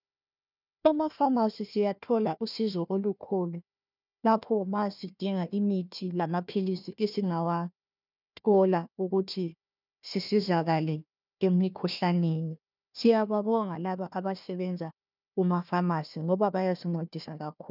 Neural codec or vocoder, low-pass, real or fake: codec, 16 kHz, 1 kbps, FunCodec, trained on Chinese and English, 50 frames a second; 5.4 kHz; fake